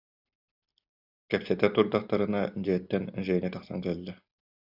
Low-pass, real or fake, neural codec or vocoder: 5.4 kHz; real; none